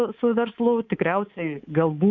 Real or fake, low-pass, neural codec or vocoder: fake; 7.2 kHz; codec, 24 kHz, 3.1 kbps, DualCodec